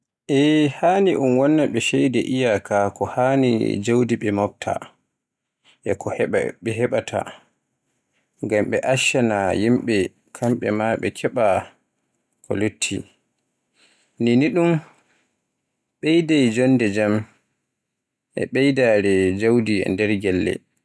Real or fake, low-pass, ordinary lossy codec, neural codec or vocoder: real; none; none; none